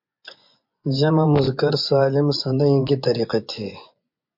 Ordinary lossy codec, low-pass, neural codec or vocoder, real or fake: MP3, 48 kbps; 5.4 kHz; vocoder, 24 kHz, 100 mel bands, Vocos; fake